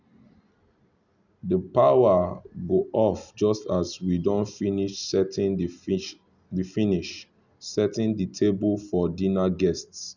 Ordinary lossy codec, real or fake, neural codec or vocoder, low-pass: none; real; none; 7.2 kHz